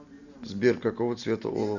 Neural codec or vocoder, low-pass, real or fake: none; 7.2 kHz; real